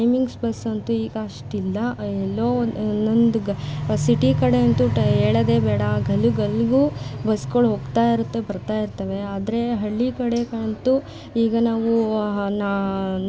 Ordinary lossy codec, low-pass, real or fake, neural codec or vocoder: none; none; real; none